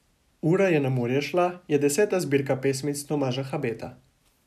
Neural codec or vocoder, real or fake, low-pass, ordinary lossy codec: none; real; 14.4 kHz; none